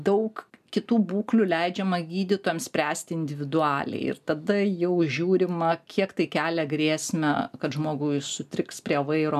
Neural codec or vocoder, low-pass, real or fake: none; 14.4 kHz; real